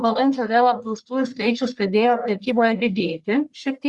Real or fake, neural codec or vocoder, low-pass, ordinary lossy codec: fake; codec, 44.1 kHz, 1.7 kbps, Pupu-Codec; 10.8 kHz; Opus, 64 kbps